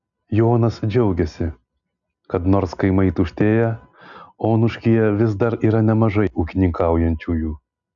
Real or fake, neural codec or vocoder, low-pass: real; none; 7.2 kHz